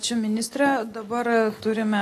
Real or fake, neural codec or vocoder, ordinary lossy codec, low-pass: real; none; AAC, 48 kbps; 14.4 kHz